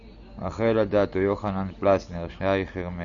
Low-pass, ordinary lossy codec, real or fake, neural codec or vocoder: 7.2 kHz; MP3, 96 kbps; real; none